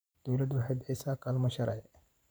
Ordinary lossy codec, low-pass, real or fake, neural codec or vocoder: none; none; fake; vocoder, 44.1 kHz, 128 mel bands every 512 samples, BigVGAN v2